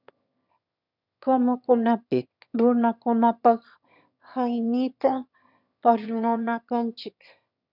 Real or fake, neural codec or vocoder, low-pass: fake; autoencoder, 22.05 kHz, a latent of 192 numbers a frame, VITS, trained on one speaker; 5.4 kHz